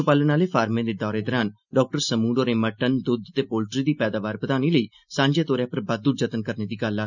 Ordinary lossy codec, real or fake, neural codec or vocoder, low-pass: none; real; none; 7.2 kHz